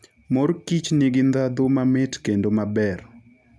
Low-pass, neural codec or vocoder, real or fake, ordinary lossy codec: none; none; real; none